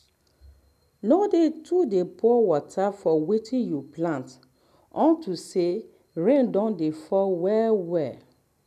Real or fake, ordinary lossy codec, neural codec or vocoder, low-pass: real; none; none; 14.4 kHz